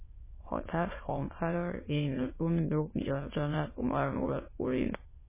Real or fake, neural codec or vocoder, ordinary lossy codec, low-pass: fake; autoencoder, 22.05 kHz, a latent of 192 numbers a frame, VITS, trained on many speakers; MP3, 16 kbps; 3.6 kHz